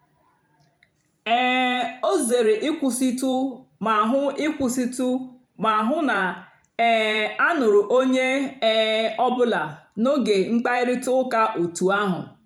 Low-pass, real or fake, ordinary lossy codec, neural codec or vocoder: 19.8 kHz; fake; none; vocoder, 44.1 kHz, 128 mel bands every 512 samples, BigVGAN v2